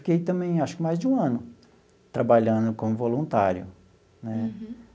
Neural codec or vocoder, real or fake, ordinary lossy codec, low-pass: none; real; none; none